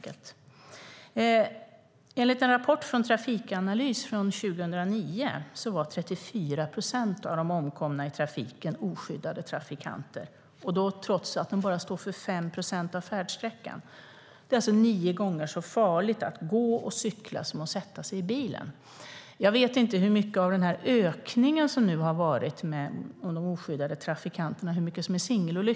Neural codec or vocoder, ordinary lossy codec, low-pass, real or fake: none; none; none; real